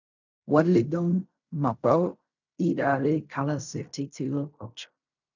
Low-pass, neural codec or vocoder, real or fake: 7.2 kHz; codec, 16 kHz in and 24 kHz out, 0.4 kbps, LongCat-Audio-Codec, fine tuned four codebook decoder; fake